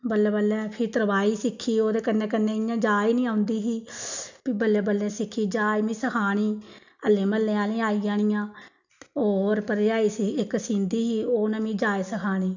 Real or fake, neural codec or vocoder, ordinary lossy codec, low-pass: real; none; none; 7.2 kHz